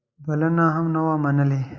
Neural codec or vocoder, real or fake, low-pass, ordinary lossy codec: none; real; 7.2 kHz; Opus, 64 kbps